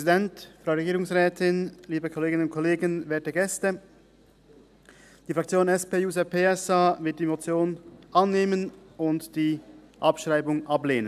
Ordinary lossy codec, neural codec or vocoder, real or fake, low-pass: none; none; real; 14.4 kHz